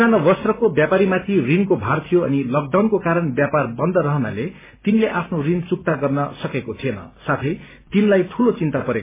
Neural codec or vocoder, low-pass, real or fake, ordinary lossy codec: none; 3.6 kHz; real; MP3, 16 kbps